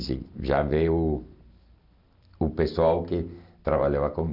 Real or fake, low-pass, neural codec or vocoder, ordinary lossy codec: real; 5.4 kHz; none; none